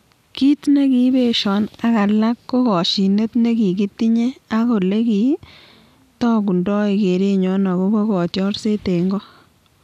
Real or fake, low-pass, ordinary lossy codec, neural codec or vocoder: real; 14.4 kHz; none; none